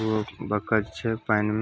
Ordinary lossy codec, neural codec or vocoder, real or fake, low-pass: none; none; real; none